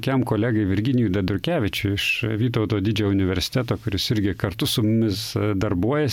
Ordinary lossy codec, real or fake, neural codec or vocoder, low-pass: MP3, 96 kbps; real; none; 19.8 kHz